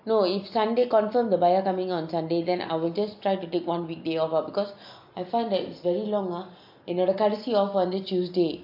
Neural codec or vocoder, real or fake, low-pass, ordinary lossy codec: none; real; 5.4 kHz; MP3, 48 kbps